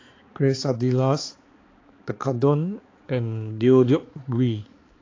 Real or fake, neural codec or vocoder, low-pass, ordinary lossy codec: fake; codec, 16 kHz, 4 kbps, X-Codec, HuBERT features, trained on balanced general audio; 7.2 kHz; AAC, 32 kbps